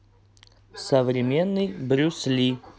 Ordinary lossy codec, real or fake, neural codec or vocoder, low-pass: none; real; none; none